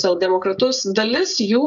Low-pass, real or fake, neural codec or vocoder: 7.2 kHz; fake; codec, 16 kHz, 16 kbps, FreqCodec, smaller model